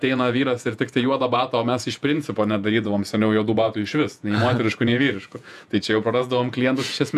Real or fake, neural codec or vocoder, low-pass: fake; vocoder, 48 kHz, 128 mel bands, Vocos; 14.4 kHz